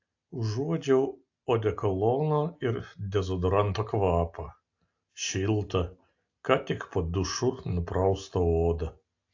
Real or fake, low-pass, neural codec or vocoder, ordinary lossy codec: real; 7.2 kHz; none; AAC, 48 kbps